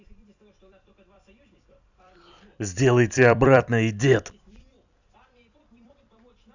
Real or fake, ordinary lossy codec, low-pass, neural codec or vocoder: fake; none; 7.2 kHz; vocoder, 44.1 kHz, 128 mel bands every 256 samples, BigVGAN v2